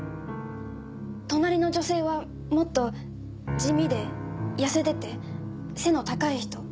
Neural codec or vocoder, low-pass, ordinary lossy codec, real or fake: none; none; none; real